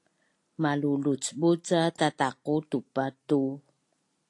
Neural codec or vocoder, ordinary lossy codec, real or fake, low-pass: none; AAC, 48 kbps; real; 10.8 kHz